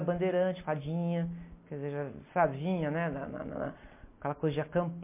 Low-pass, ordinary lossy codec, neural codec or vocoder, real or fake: 3.6 kHz; MP3, 24 kbps; none; real